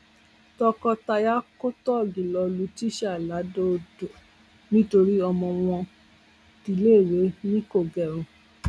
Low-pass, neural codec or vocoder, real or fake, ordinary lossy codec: none; none; real; none